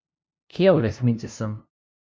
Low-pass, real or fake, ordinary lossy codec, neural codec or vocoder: none; fake; none; codec, 16 kHz, 0.5 kbps, FunCodec, trained on LibriTTS, 25 frames a second